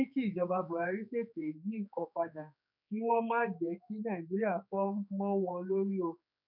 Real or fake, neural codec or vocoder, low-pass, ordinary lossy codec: fake; codec, 16 kHz, 4 kbps, X-Codec, HuBERT features, trained on general audio; 5.4 kHz; none